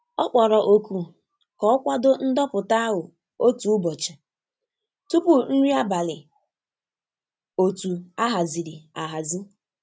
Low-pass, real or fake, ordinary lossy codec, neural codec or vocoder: none; real; none; none